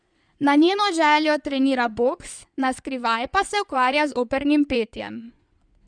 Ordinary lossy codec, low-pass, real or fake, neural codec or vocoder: none; 9.9 kHz; fake; codec, 16 kHz in and 24 kHz out, 2.2 kbps, FireRedTTS-2 codec